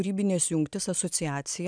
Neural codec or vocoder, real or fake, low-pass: none; real; 9.9 kHz